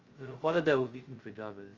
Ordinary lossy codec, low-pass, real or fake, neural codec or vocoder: Opus, 32 kbps; 7.2 kHz; fake; codec, 16 kHz, 0.2 kbps, FocalCodec